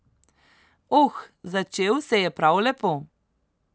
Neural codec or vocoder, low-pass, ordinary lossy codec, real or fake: none; none; none; real